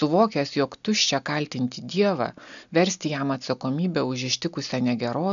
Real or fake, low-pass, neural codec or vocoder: real; 7.2 kHz; none